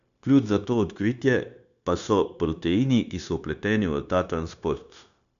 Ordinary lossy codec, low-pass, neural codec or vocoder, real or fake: none; 7.2 kHz; codec, 16 kHz, 0.9 kbps, LongCat-Audio-Codec; fake